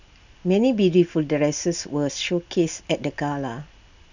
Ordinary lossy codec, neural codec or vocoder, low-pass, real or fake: none; none; 7.2 kHz; real